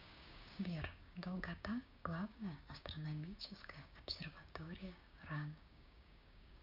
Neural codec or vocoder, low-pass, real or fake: codec, 16 kHz, 6 kbps, DAC; 5.4 kHz; fake